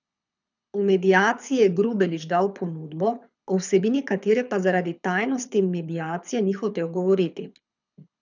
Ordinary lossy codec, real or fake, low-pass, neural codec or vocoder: none; fake; 7.2 kHz; codec, 24 kHz, 6 kbps, HILCodec